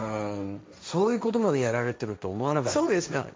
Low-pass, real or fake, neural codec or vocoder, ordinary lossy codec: none; fake; codec, 16 kHz, 1.1 kbps, Voila-Tokenizer; none